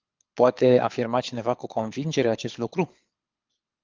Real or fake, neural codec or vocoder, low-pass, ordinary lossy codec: fake; codec, 24 kHz, 6 kbps, HILCodec; 7.2 kHz; Opus, 24 kbps